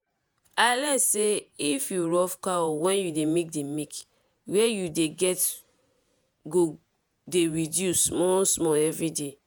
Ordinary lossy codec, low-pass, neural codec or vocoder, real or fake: none; none; vocoder, 48 kHz, 128 mel bands, Vocos; fake